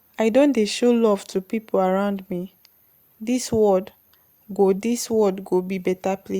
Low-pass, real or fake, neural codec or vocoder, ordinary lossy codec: 19.8 kHz; real; none; Opus, 64 kbps